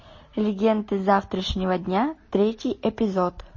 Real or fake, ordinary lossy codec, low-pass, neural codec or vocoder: real; MP3, 32 kbps; 7.2 kHz; none